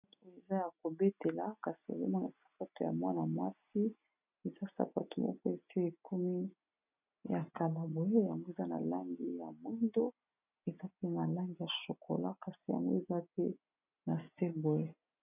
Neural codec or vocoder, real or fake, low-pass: none; real; 3.6 kHz